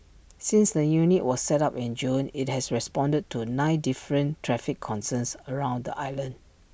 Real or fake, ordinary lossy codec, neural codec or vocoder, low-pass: real; none; none; none